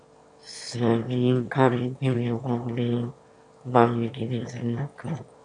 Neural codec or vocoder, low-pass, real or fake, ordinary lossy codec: autoencoder, 22.05 kHz, a latent of 192 numbers a frame, VITS, trained on one speaker; 9.9 kHz; fake; MP3, 64 kbps